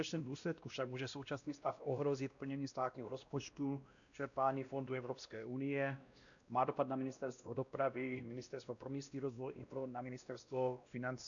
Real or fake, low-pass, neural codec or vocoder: fake; 7.2 kHz; codec, 16 kHz, 1 kbps, X-Codec, WavLM features, trained on Multilingual LibriSpeech